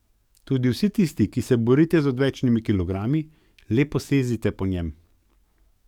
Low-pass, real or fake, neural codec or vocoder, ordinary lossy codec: 19.8 kHz; fake; codec, 44.1 kHz, 7.8 kbps, DAC; none